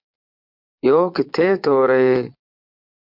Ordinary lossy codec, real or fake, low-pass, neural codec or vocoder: MP3, 48 kbps; fake; 5.4 kHz; vocoder, 22.05 kHz, 80 mel bands, Vocos